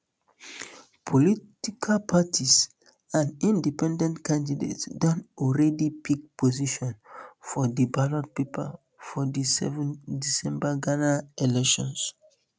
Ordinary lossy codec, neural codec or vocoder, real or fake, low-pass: none; none; real; none